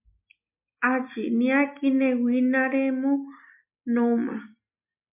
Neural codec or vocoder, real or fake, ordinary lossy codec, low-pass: none; real; MP3, 32 kbps; 3.6 kHz